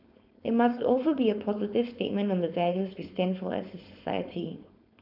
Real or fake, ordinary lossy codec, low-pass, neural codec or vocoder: fake; none; 5.4 kHz; codec, 16 kHz, 4.8 kbps, FACodec